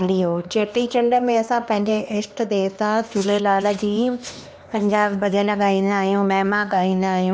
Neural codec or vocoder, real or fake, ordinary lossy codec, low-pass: codec, 16 kHz, 2 kbps, X-Codec, HuBERT features, trained on LibriSpeech; fake; none; none